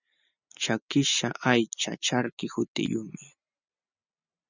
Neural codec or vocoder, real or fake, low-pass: none; real; 7.2 kHz